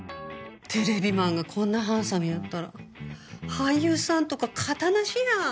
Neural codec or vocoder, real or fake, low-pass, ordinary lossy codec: none; real; none; none